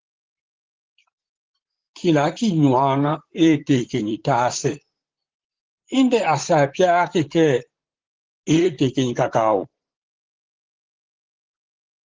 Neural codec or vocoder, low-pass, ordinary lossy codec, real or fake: vocoder, 44.1 kHz, 128 mel bands, Pupu-Vocoder; 7.2 kHz; Opus, 16 kbps; fake